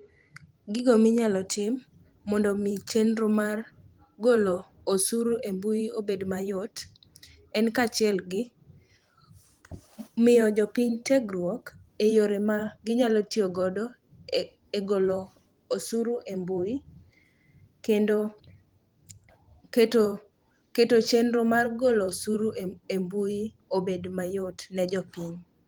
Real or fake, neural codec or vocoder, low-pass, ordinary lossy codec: fake; vocoder, 44.1 kHz, 128 mel bands every 512 samples, BigVGAN v2; 19.8 kHz; Opus, 24 kbps